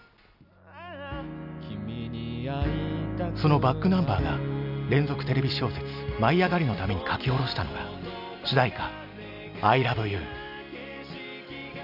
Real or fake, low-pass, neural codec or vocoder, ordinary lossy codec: real; 5.4 kHz; none; none